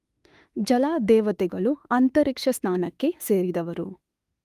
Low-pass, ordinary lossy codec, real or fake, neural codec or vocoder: 14.4 kHz; Opus, 32 kbps; fake; autoencoder, 48 kHz, 32 numbers a frame, DAC-VAE, trained on Japanese speech